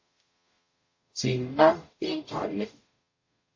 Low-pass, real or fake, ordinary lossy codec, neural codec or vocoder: 7.2 kHz; fake; MP3, 32 kbps; codec, 44.1 kHz, 0.9 kbps, DAC